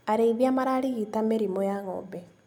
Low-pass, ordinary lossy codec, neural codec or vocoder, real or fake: 19.8 kHz; none; none; real